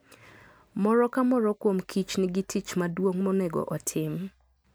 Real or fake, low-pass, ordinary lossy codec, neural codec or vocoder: real; none; none; none